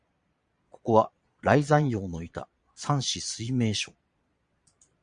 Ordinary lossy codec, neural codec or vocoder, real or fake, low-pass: Opus, 64 kbps; vocoder, 22.05 kHz, 80 mel bands, Vocos; fake; 9.9 kHz